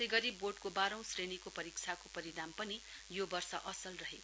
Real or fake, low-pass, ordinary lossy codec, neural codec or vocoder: real; none; none; none